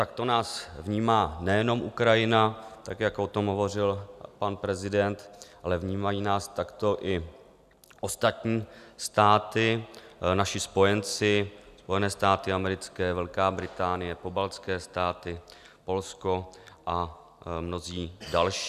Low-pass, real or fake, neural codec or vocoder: 14.4 kHz; real; none